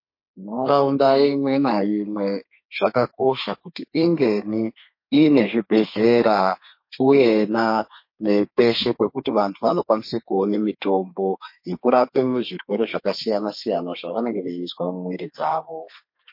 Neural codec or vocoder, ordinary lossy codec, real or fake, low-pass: codec, 32 kHz, 1.9 kbps, SNAC; MP3, 32 kbps; fake; 5.4 kHz